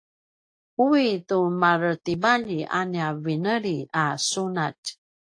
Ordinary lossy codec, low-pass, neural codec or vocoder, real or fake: AAC, 48 kbps; 9.9 kHz; none; real